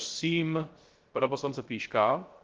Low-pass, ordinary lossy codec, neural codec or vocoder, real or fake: 7.2 kHz; Opus, 16 kbps; codec, 16 kHz, 0.3 kbps, FocalCodec; fake